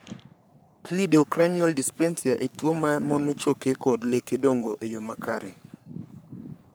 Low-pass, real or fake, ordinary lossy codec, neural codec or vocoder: none; fake; none; codec, 44.1 kHz, 3.4 kbps, Pupu-Codec